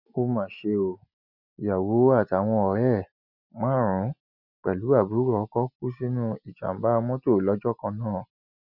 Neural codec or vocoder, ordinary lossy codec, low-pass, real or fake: none; none; 5.4 kHz; real